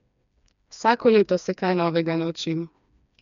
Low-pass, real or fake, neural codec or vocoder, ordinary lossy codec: 7.2 kHz; fake; codec, 16 kHz, 2 kbps, FreqCodec, smaller model; none